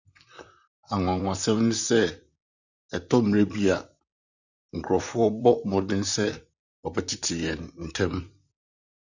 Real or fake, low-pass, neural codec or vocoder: fake; 7.2 kHz; vocoder, 44.1 kHz, 128 mel bands, Pupu-Vocoder